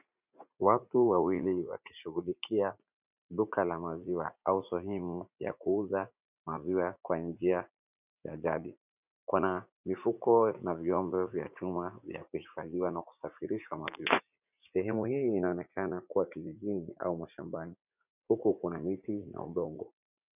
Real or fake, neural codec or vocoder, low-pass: fake; vocoder, 44.1 kHz, 80 mel bands, Vocos; 3.6 kHz